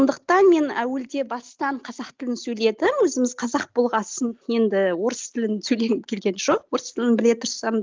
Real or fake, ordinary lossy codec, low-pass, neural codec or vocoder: real; Opus, 32 kbps; 7.2 kHz; none